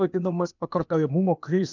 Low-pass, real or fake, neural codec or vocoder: 7.2 kHz; fake; codec, 16 kHz, 0.8 kbps, ZipCodec